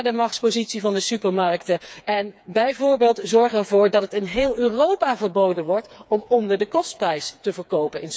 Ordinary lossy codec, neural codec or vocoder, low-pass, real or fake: none; codec, 16 kHz, 4 kbps, FreqCodec, smaller model; none; fake